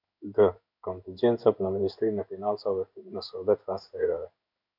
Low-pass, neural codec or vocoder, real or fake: 5.4 kHz; codec, 16 kHz in and 24 kHz out, 1 kbps, XY-Tokenizer; fake